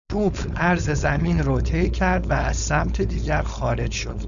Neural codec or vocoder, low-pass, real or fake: codec, 16 kHz, 4.8 kbps, FACodec; 7.2 kHz; fake